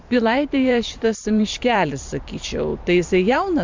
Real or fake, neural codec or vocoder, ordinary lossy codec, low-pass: fake; vocoder, 44.1 kHz, 128 mel bands every 512 samples, BigVGAN v2; MP3, 64 kbps; 7.2 kHz